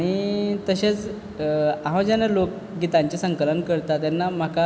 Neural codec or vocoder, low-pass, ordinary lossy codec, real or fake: none; none; none; real